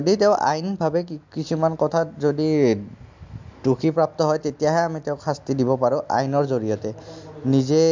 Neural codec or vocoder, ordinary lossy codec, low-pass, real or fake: none; MP3, 64 kbps; 7.2 kHz; real